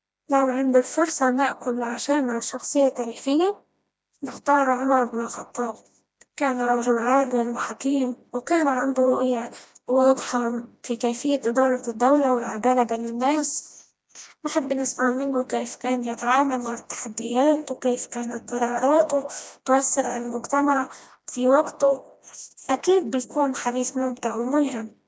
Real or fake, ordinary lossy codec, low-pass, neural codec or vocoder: fake; none; none; codec, 16 kHz, 1 kbps, FreqCodec, smaller model